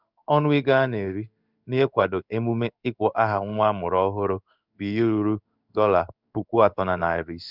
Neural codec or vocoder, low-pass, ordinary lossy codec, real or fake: codec, 16 kHz in and 24 kHz out, 1 kbps, XY-Tokenizer; 5.4 kHz; none; fake